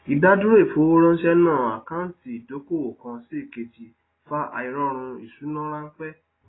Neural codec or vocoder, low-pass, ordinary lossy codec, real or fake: none; 7.2 kHz; AAC, 16 kbps; real